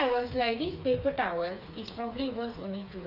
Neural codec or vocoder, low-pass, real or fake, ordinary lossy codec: codec, 16 kHz, 4 kbps, FreqCodec, smaller model; 5.4 kHz; fake; AAC, 48 kbps